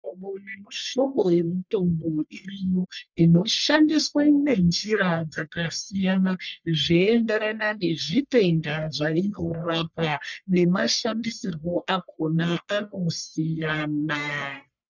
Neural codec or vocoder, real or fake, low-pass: codec, 44.1 kHz, 1.7 kbps, Pupu-Codec; fake; 7.2 kHz